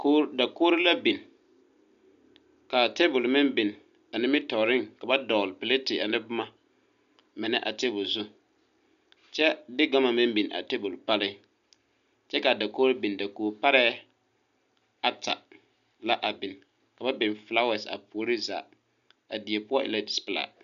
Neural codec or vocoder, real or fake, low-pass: none; real; 7.2 kHz